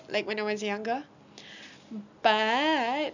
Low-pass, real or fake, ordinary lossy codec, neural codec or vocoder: 7.2 kHz; real; none; none